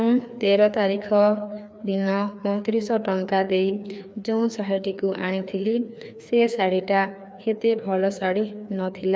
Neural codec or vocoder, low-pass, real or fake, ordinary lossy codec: codec, 16 kHz, 2 kbps, FreqCodec, larger model; none; fake; none